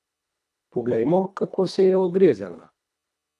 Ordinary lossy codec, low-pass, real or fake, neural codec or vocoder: none; none; fake; codec, 24 kHz, 1.5 kbps, HILCodec